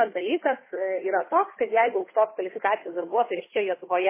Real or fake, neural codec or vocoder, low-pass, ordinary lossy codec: fake; codec, 16 kHz, 2 kbps, FunCodec, trained on Chinese and English, 25 frames a second; 3.6 kHz; MP3, 16 kbps